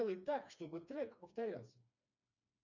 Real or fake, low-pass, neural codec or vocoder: fake; 7.2 kHz; codec, 16 kHz, 2 kbps, FreqCodec, smaller model